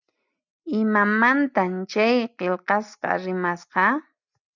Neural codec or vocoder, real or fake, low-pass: none; real; 7.2 kHz